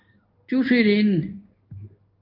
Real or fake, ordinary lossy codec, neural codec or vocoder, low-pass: fake; Opus, 32 kbps; codec, 16 kHz in and 24 kHz out, 1 kbps, XY-Tokenizer; 5.4 kHz